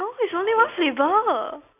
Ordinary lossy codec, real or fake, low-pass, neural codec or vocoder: none; real; 3.6 kHz; none